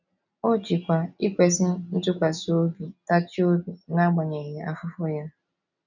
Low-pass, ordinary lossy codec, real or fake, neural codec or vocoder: 7.2 kHz; none; real; none